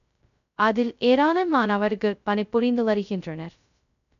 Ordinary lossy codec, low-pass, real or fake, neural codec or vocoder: none; 7.2 kHz; fake; codec, 16 kHz, 0.2 kbps, FocalCodec